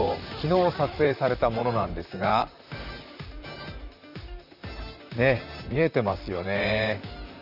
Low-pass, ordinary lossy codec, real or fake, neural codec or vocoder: 5.4 kHz; none; fake; vocoder, 44.1 kHz, 128 mel bands, Pupu-Vocoder